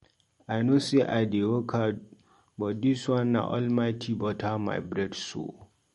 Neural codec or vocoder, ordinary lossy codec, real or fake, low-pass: none; MP3, 48 kbps; real; 19.8 kHz